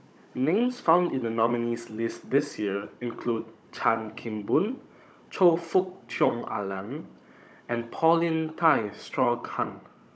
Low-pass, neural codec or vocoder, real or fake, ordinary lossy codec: none; codec, 16 kHz, 16 kbps, FunCodec, trained on Chinese and English, 50 frames a second; fake; none